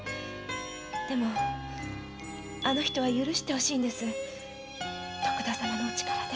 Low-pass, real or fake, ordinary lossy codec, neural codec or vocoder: none; real; none; none